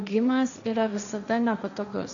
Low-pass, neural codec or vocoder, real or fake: 7.2 kHz; codec, 16 kHz, 1.1 kbps, Voila-Tokenizer; fake